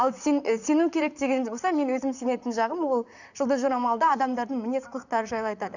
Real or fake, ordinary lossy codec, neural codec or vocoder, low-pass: fake; none; vocoder, 44.1 kHz, 128 mel bands, Pupu-Vocoder; 7.2 kHz